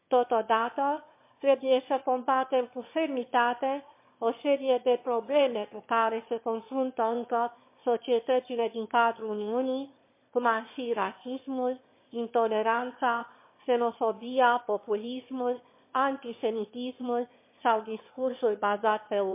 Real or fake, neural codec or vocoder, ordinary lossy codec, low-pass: fake; autoencoder, 22.05 kHz, a latent of 192 numbers a frame, VITS, trained on one speaker; MP3, 24 kbps; 3.6 kHz